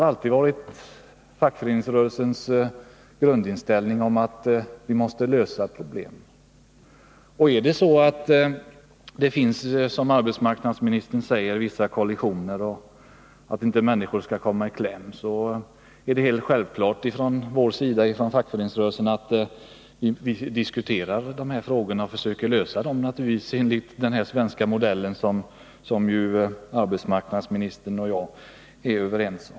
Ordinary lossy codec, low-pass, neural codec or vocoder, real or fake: none; none; none; real